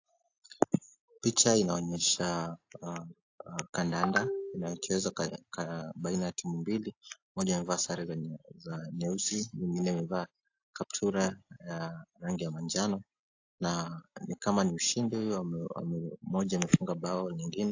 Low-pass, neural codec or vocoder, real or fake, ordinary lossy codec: 7.2 kHz; none; real; AAC, 48 kbps